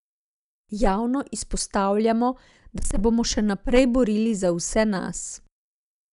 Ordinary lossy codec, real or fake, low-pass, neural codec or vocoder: none; real; 10.8 kHz; none